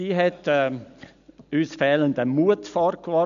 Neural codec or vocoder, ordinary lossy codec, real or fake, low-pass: none; none; real; 7.2 kHz